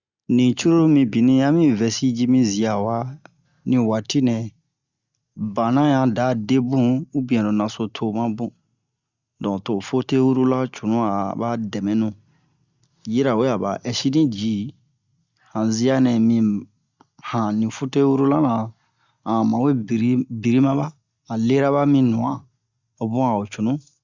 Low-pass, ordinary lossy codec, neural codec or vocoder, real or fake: 7.2 kHz; Opus, 64 kbps; none; real